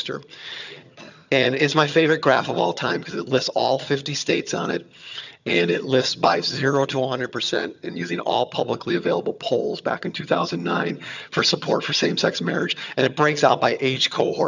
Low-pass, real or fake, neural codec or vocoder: 7.2 kHz; fake; vocoder, 22.05 kHz, 80 mel bands, HiFi-GAN